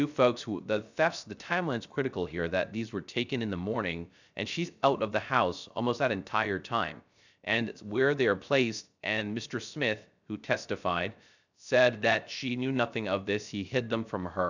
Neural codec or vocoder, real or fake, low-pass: codec, 16 kHz, 0.3 kbps, FocalCodec; fake; 7.2 kHz